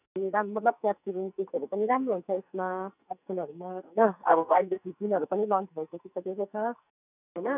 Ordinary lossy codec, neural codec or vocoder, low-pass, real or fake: none; codec, 44.1 kHz, 2.6 kbps, SNAC; 3.6 kHz; fake